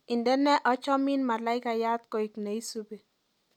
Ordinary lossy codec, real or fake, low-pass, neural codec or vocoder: none; real; 19.8 kHz; none